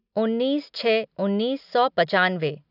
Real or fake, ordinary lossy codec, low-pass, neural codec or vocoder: real; none; 5.4 kHz; none